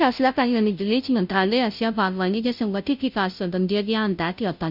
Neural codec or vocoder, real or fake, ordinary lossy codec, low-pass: codec, 16 kHz, 0.5 kbps, FunCodec, trained on Chinese and English, 25 frames a second; fake; none; 5.4 kHz